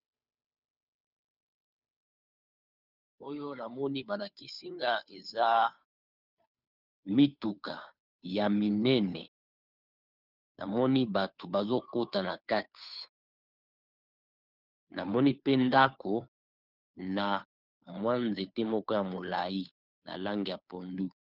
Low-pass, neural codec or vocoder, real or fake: 5.4 kHz; codec, 16 kHz, 2 kbps, FunCodec, trained on Chinese and English, 25 frames a second; fake